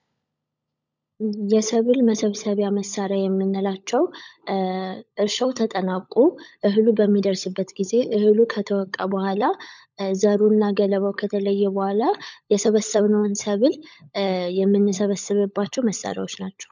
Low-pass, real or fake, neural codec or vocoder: 7.2 kHz; fake; codec, 16 kHz, 16 kbps, FunCodec, trained on LibriTTS, 50 frames a second